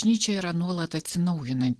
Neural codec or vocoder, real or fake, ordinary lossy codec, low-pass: vocoder, 44.1 kHz, 128 mel bands every 512 samples, BigVGAN v2; fake; Opus, 16 kbps; 10.8 kHz